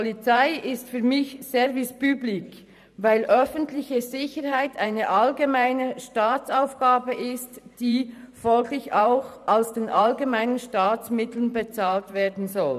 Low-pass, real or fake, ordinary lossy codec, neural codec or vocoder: 14.4 kHz; fake; none; vocoder, 44.1 kHz, 128 mel bands every 512 samples, BigVGAN v2